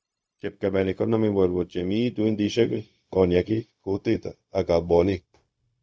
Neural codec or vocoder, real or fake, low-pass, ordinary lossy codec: codec, 16 kHz, 0.4 kbps, LongCat-Audio-Codec; fake; none; none